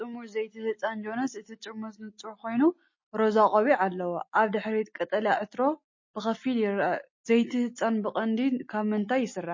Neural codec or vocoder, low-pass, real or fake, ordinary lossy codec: none; 7.2 kHz; real; MP3, 32 kbps